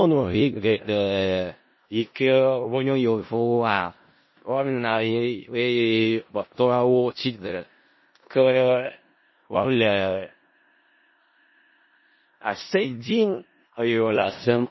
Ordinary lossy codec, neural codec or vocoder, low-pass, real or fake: MP3, 24 kbps; codec, 16 kHz in and 24 kHz out, 0.4 kbps, LongCat-Audio-Codec, four codebook decoder; 7.2 kHz; fake